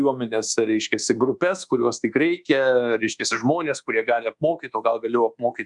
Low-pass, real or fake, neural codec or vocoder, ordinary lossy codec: 10.8 kHz; fake; codec, 24 kHz, 1.2 kbps, DualCodec; Opus, 64 kbps